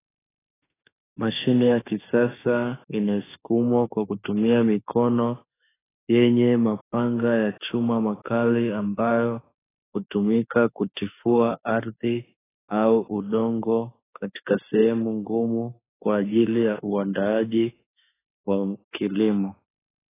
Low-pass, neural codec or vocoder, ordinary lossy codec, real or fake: 3.6 kHz; autoencoder, 48 kHz, 32 numbers a frame, DAC-VAE, trained on Japanese speech; AAC, 16 kbps; fake